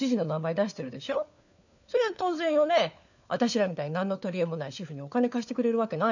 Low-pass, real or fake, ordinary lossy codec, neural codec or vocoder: 7.2 kHz; fake; none; codec, 16 kHz, 4 kbps, FunCodec, trained on LibriTTS, 50 frames a second